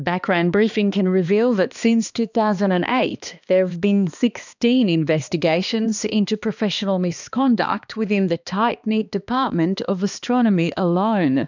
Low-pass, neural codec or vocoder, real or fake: 7.2 kHz; codec, 16 kHz, 2 kbps, X-Codec, HuBERT features, trained on balanced general audio; fake